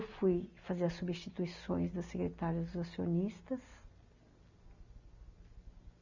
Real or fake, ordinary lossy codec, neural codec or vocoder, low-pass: real; none; none; 7.2 kHz